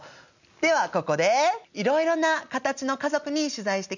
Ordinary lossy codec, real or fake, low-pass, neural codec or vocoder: none; real; 7.2 kHz; none